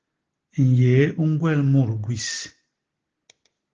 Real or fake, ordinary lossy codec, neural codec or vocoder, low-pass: real; Opus, 16 kbps; none; 7.2 kHz